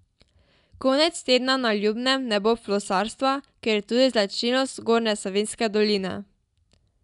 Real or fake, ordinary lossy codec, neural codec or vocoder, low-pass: real; none; none; 10.8 kHz